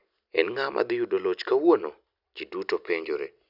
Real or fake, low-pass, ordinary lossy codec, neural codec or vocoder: real; 5.4 kHz; none; none